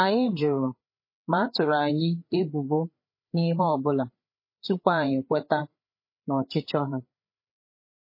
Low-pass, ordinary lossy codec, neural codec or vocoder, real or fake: 5.4 kHz; MP3, 24 kbps; codec, 16 kHz, 8 kbps, FreqCodec, larger model; fake